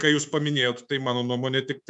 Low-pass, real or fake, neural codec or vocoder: 10.8 kHz; fake; codec, 24 kHz, 3.1 kbps, DualCodec